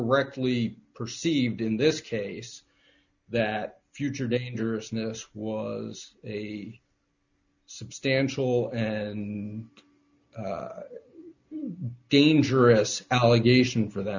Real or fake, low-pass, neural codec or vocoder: real; 7.2 kHz; none